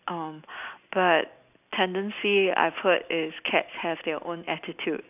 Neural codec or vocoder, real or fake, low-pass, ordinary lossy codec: none; real; 3.6 kHz; none